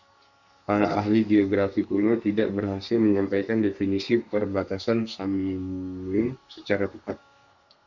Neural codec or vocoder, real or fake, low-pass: codec, 32 kHz, 1.9 kbps, SNAC; fake; 7.2 kHz